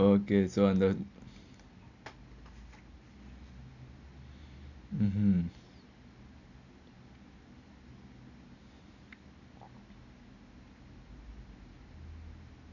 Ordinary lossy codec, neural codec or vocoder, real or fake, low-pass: none; none; real; 7.2 kHz